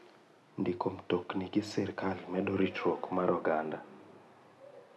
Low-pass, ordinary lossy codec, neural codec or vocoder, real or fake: none; none; none; real